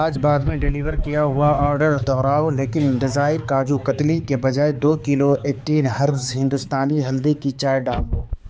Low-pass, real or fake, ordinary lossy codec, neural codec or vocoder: none; fake; none; codec, 16 kHz, 4 kbps, X-Codec, HuBERT features, trained on balanced general audio